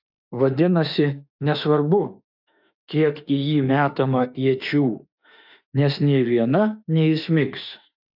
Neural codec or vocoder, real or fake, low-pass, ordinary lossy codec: autoencoder, 48 kHz, 32 numbers a frame, DAC-VAE, trained on Japanese speech; fake; 5.4 kHz; AAC, 48 kbps